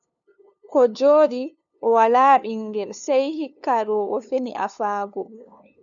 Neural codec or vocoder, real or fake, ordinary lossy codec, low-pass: codec, 16 kHz, 2 kbps, FunCodec, trained on LibriTTS, 25 frames a second; fake; MP3, 96 kbps; 7.2 kHz